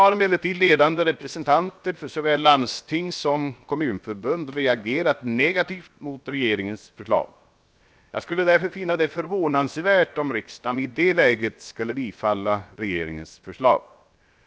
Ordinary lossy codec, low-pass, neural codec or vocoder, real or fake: none; none; codec, 16 kHz, 0.7 kbps, FocalCodec; fake